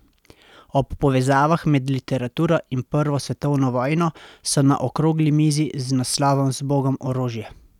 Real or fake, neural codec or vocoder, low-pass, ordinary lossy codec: real; none; 19.8 kHz; none